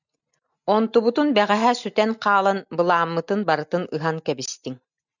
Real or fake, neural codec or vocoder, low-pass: real; none; 7.2 kHz